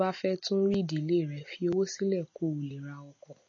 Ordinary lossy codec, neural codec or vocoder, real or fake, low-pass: MP3, 32 kbps; none; real; 7.2 kHz